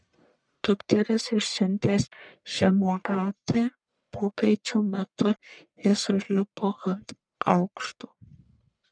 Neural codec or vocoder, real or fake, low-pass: codec, 44.1 kHz, 1.7 kbps, Pupu-Codec; fake; 9.9 kHz